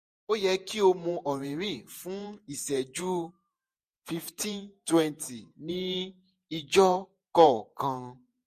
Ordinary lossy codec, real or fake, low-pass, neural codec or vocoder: MP3, 64 kbps; fake; 14.4 kHz; vocoder, 48 kHz, 128 mel bands, Vocos